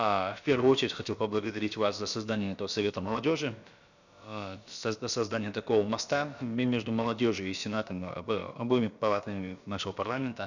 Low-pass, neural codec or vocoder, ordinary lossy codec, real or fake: 7.2 kHz; codec, 16 kHz, about 1 kbps, DyCAST, with the encoder's durations; none; fake